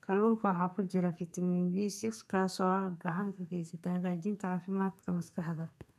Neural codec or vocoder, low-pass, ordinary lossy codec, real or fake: codec, 32 kHz, 1.9 kbps, SNAC; 14.4 kHz; none; fake